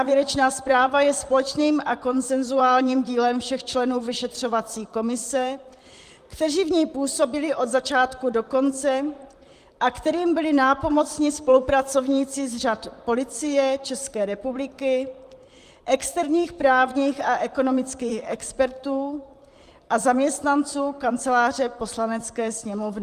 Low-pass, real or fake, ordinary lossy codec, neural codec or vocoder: 14.4 kHz; fake; Opus, 32 kbps; vocoder, 44.1 kHz, 128 mel bands, Pupu-Vocoder